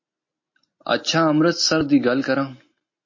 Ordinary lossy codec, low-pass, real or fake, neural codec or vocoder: MP3, 32 kbps; 7.2 kHz; real; none